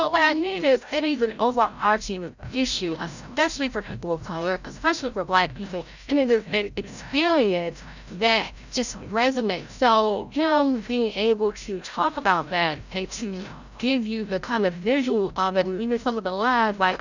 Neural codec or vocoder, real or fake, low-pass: codec, 16 kHz, 0.5 kbps, FreqCodec, larger model; fake; 7.2 kHz